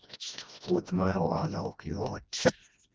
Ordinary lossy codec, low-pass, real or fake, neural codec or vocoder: none; none; fake; codec, 16 kHz, 1 kbps, FreqCodec, smaller model